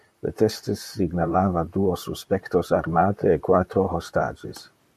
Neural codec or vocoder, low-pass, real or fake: vocoder, 44.1 kHz, 128 mel bands, Pupu-Vocoder; 14.4 kHz; fake